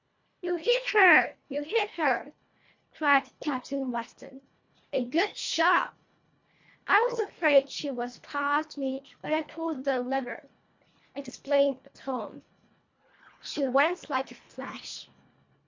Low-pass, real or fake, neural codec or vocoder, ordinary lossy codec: 7.2 kHz; fake; codec, 24 kHz, 1.5 kbps, HILCodec; MP3, 48 kbps